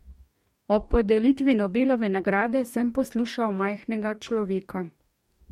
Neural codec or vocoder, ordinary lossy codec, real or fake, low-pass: codec, 44.1 kHz, 2.6 kbps, DAC; MP3, 64 kbps; fake; 19.8 kHz